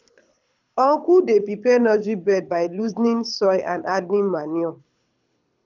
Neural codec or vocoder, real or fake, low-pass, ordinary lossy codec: codec, 24 kHz, 6 kbps, HILCodec; fake; 7.2 kHz; none